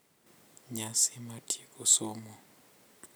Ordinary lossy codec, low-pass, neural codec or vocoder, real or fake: none; none; none; real